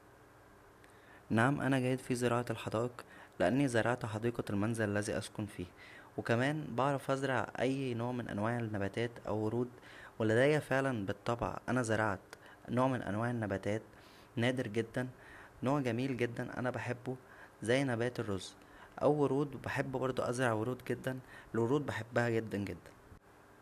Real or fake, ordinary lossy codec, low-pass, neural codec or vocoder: real; none; 14.4 kHz; none